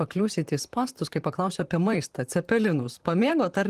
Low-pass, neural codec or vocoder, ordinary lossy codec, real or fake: 14.4 kHz; vocoder, 44.1 kHz, 128 mel bands, Pupu-Vocoder; Opus, 24 kbps; fake